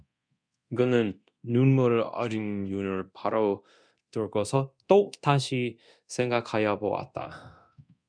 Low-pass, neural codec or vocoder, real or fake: 9.9 kHz; codec, 24 kHz, 0.9 kbps, DualCodec; fake